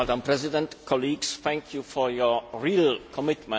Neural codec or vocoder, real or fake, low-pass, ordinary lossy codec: none; real; none; none